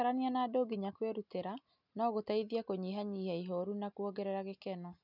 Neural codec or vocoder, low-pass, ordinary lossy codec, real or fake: none; 5.4 kHz; none; real